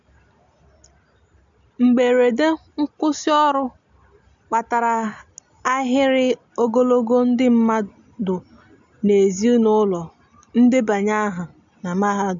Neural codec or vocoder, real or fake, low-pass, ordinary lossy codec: none; real; 7.2 kHz; MP3, 64 kbps